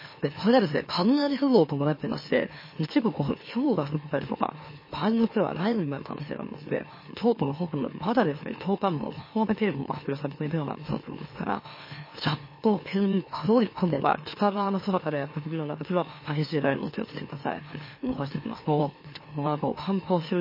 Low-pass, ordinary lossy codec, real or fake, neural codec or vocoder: 5.4 kHz; MP3, 24 kbps; fake; autoencoder, 44.1 kHz, a latent of 192 numbers a frame, MeloTTS